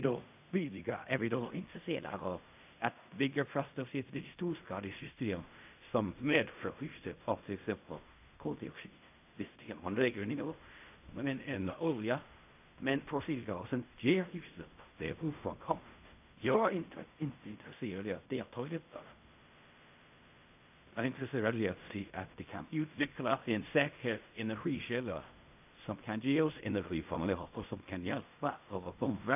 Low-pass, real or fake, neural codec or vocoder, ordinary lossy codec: 3.6 kHz; fake; codec, 16 kHz in and 24 kHz out, 0.4 kbps, LongCat-Audio-Codec, fine tuned four codebook decoder; AAC, 32 kbps